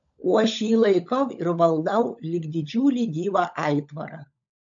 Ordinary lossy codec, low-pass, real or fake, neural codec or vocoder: AAC, 64 kbps; 7.2 kHz; fake; codec, 16 kHz, 16 kbps, FunCodec, trained on LibriTTS, 50 frames a second